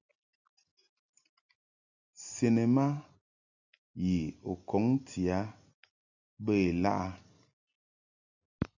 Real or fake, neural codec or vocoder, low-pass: real; none; 7.2 kHz